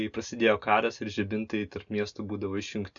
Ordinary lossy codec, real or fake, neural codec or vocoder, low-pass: MP3, 96 kbps; real; none; 7.2 kHz